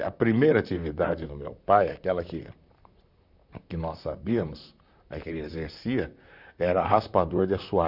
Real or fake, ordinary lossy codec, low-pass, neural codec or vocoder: fake; none; 5.4 kHz; vocoder, 44.1 kHz, 128 mel bands, Pupu-Vocoder